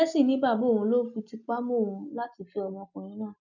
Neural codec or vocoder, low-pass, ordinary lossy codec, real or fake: none; 7.2 kHz; none; real